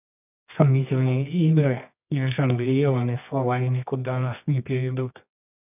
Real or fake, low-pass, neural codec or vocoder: fake; 3.6 kHz; codec, 24 kHz, 0.9 kbps, WavTokenizer, medium music audio release